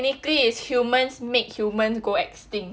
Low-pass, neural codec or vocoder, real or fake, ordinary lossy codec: none; none; real; none